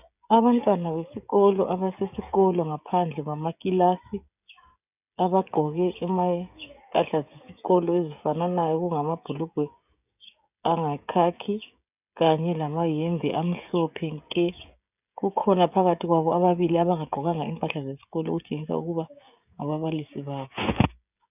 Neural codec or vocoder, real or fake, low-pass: codec, 16 kHz, 16 kbps, FreqCodec, smaller model; fake; 3.6 kHz